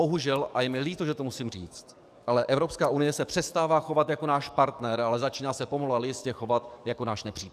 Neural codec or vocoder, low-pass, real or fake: codec, 44.1 kHz, 7.8 kbps, DAC; 14.4 kHz; fake